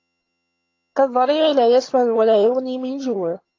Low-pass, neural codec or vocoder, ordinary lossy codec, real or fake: 7.2 kHz; vocoder, 22.05 kHz, 80 mel bands, HiFi-GAN; AAC, 32 kbps; fake